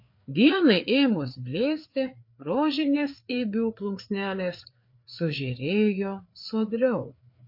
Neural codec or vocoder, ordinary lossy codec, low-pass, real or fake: codec, 16 kHz, 4 kbps, FreqCodec, larger model; MP3, 32 kbps; 5.4 kHz; fake